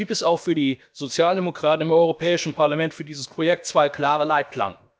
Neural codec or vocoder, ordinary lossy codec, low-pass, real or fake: codec, 16 kHz, about 1 kbps, DyCAST, with the encoder's durations; none; none; fake